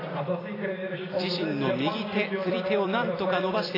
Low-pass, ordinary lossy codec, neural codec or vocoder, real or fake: 5.4 kHz; AAC, 24 kbps; vocoder, 44.1 kHz, 128 mel bands every 256 samples, BigVGAN v2; fake